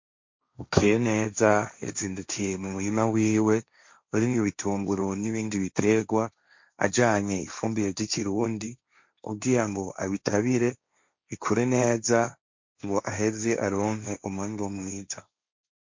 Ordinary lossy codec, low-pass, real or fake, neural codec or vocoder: MP3, 48 kbps; 7.2 kHz; fake; codec, 16 kHz, 1.1 kbps, Voila-Tokenizer